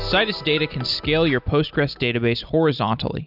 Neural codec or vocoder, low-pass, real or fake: none; 5.4 kHz; real